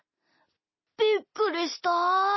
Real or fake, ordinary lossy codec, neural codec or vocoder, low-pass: real; MP3, 24 kbps; none; 7.2 kHz